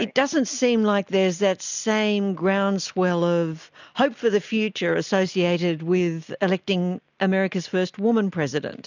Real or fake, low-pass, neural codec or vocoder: real; 7.2 kHz; none